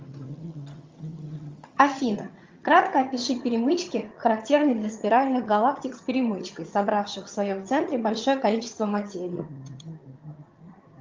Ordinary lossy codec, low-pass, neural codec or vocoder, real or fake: Opus, 32 kbps; 7.2 kHz; vocoder, 22.05 kHz, 80 mel bands, HiFi-GAN; fake